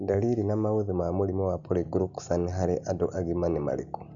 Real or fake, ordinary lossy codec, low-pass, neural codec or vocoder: real; none; 7.2 kHz; none